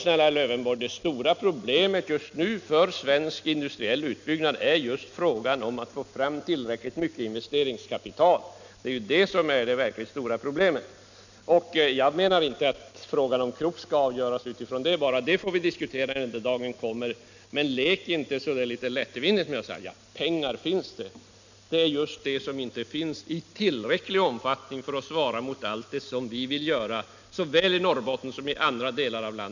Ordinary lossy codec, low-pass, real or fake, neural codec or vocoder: none; 7.2 kHz; real; none